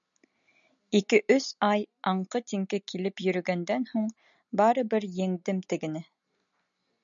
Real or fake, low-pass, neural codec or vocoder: real; 7.2 kHz; none